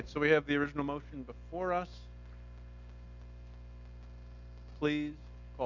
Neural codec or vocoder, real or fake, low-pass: none; real; 7.2 kHz